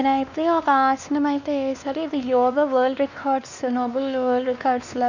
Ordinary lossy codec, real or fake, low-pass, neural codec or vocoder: none; fake; 7.2 kHz; codec, 16 kHz, 2 kbps, X-Codec, WavLM features, trained on Multilingual LibriSpeech